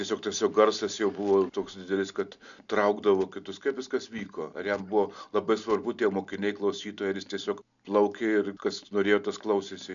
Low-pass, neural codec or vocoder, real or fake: 7.2 kHz; none; real